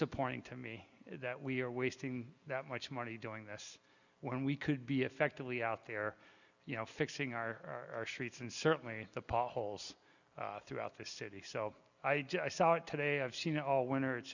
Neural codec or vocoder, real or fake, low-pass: none; real; 7.2 kHz